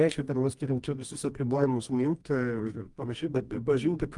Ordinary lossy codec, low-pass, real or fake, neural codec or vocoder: Opus, 32 kbps; 10.8 kHz; fake; codec, 24 kHz, 0.9 kbps, WavTokenizer, medium music audio release